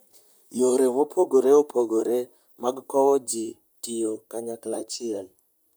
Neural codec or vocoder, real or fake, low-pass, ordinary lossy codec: vocoder, 44.1 kHz, 128 mel bands, Pupu-Vocoder; fake; none; none